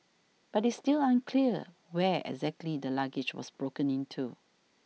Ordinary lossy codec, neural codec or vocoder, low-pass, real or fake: none; none; none; real